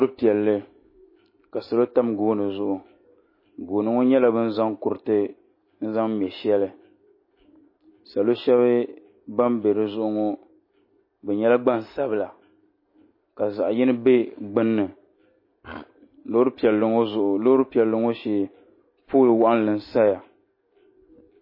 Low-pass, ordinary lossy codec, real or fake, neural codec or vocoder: 5.4 kHz; MP3, 24 kbps; real; none